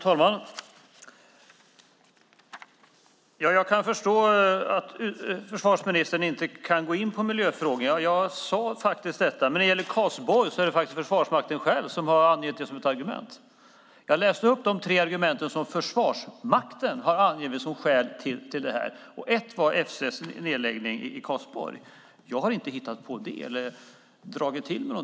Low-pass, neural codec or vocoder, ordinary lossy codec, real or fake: none; none; none; real